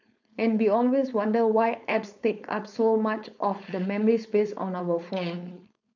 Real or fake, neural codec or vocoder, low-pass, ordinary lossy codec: fake; codec, 16 kHz, 4.8 kbps, FACodec; 7.2 kHz; none